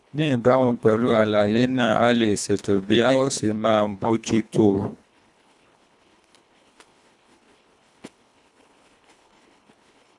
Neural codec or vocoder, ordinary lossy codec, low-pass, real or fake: codec, 24 kHz, 1.5 kbps, HILCodec; none; none; fake